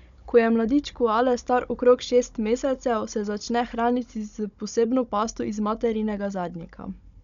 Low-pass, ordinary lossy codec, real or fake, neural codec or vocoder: 7.2 kHz; none; fake; codec, 16 kHz, 16 kbps, FunCodec, trained on Chinese and English, 50 frames a second